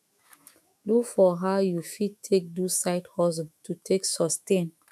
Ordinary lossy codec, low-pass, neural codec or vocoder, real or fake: MP3, 96 kbps; 14.4 kHz; autoencoder, 48 kHz, 128 numbers a frame, DAC-VAE, trained on Japanese speech; fake